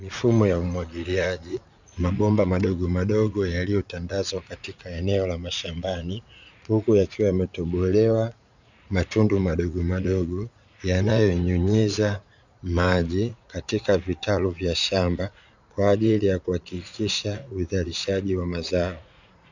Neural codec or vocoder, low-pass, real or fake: vocoder, 44.1 kHz, 80 mel bands, Vocos; 7.2 kHz; fake